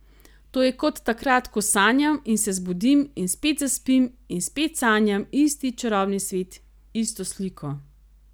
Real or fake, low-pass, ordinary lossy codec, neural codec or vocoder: real; none; none; none